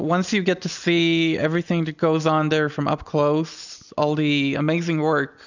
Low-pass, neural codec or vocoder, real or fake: 7.2 kHz; none; real